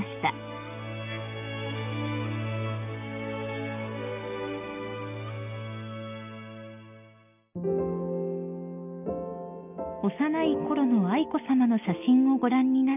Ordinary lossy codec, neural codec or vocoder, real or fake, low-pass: none; none; real; 3.6 kHz